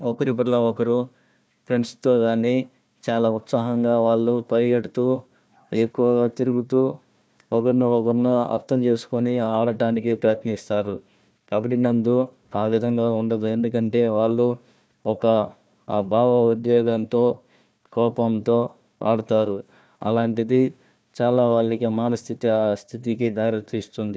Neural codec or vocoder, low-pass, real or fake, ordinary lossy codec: codec, 16 kHz, 1 kbps, FunCodec, trained on Chinese and English, 50 frames a second; none; fake; none